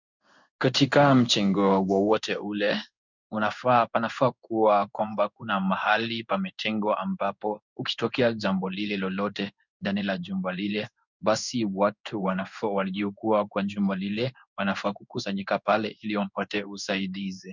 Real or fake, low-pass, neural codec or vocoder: fake; 7.2 kHz; codec, 16 kHz in and 24 kHz out, 1 kbps, XY-Tokenizer